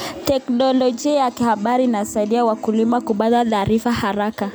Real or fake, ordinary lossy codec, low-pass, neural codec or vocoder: real; none; none; none